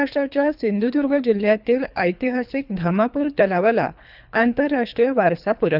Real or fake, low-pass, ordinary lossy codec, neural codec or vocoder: fake; 5.4 kHz; none; codec, 24 kHz, 3 kbps, HILCodec